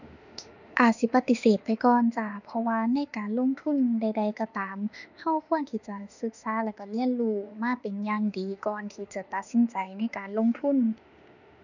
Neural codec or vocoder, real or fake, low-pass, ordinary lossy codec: autoencoder, 48 kHz, 32 numbers a frame, DAC-VAE, trained on Japanese speech; fake; 7.2 kHz; none